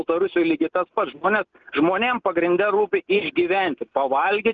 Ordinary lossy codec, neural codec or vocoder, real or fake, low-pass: Opus, 24 kbps; none; real; 7.2 kHz